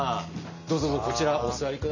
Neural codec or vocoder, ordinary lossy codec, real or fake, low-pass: none; none; real; 7.2 kHz